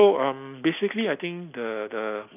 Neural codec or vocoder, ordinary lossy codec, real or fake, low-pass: none; none; real; 3.6 kHz